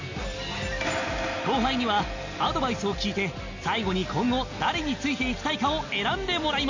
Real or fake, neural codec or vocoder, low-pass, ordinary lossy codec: real; none; 7.2 kHz; AAC, 48 kbps